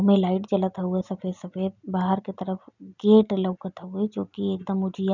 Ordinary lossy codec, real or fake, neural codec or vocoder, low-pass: none; real; none; 7.2 kHz